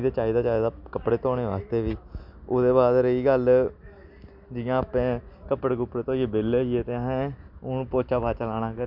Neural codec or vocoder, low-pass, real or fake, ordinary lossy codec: none; 5.4 kHz; real; none